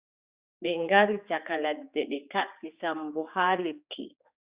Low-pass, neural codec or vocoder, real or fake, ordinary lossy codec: 3.6 kHz; codec, 16 kHz, 4 kbps, X-Codec, HuBERT features, trained on general audio; fake; Opus, 64 kbps